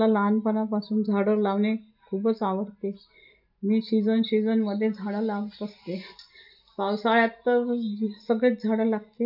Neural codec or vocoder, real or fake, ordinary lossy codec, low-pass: none; real; none; 5.4 kHz